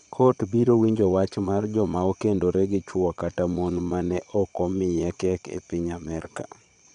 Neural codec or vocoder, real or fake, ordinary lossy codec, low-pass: vocoder, 22.05 kHz, 80 mel bands, Vocos; fake; none; 9.9 kHz